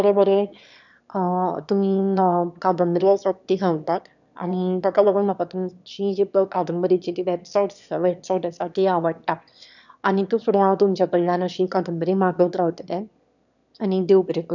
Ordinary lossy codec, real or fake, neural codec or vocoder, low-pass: none; fake; autoencoder, 22.05 kHz, a latent of 192 numbers a frame, VITS, trained on one speaker; 7.2 kHz